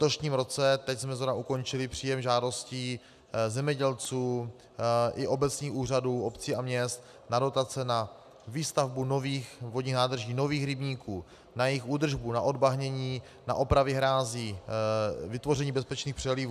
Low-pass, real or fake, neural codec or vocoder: 14.4 kHz; real; none